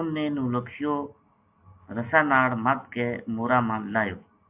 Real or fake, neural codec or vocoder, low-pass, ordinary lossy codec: real; none; 3.6 kHz; AAC, 32 kbps